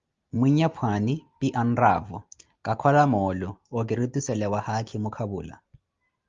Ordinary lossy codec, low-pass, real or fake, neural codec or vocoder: Opus, 32 kbps; 7.2 kHz; real; none